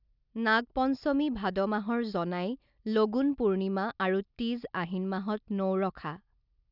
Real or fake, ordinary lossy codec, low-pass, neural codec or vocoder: real; none; 5.4 kHz; none